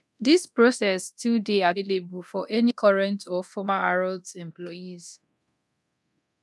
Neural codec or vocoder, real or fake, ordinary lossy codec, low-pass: codec, 24 kHz, 0.9 kbps, DualCodec; fake; none; none